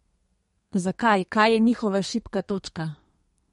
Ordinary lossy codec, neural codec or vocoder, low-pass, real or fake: MP3, 48 kbps; codec, 32 kHz, 1.9 kbps, SNAC; 14.4 kHz; fake